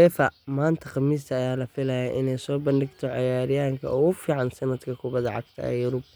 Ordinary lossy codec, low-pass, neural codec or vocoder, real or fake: none; none; none; real